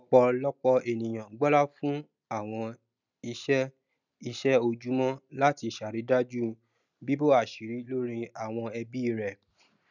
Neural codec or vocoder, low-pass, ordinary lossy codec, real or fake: none; 7.2 kHz; none; real